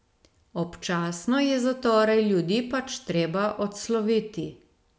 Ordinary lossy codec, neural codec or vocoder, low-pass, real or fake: none; none; none; real